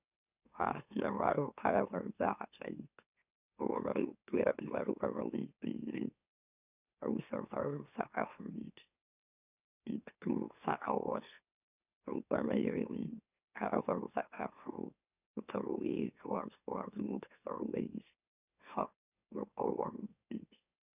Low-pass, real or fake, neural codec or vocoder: 3.6 kHz; fake; autoencoder, 44.1 kHz, a latent of 192 numbers a frame, MeloTTS